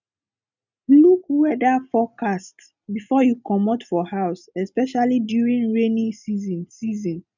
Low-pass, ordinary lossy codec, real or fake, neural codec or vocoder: 7.2 kHz; none; real; none